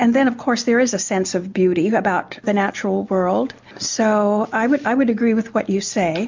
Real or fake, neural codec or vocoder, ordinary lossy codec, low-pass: real; none; MP3, 64 kbps; 7.2 kHz